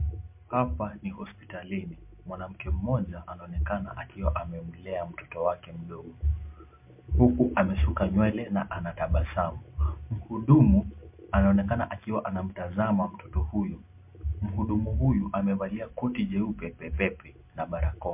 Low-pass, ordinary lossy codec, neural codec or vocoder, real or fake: 3.6 kHz; MP3, 32 kbps; none; real